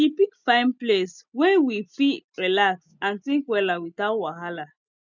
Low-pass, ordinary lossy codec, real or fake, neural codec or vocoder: 7.2 kHz; none; real; none